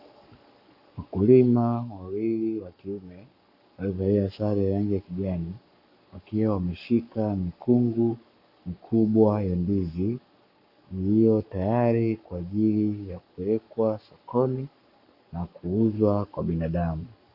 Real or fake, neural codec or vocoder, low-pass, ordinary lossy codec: fake; codec, 44.1 kHz, 7.8 kbps, Pupu-Codec; 5.4 kHz; AAC, 48 kbps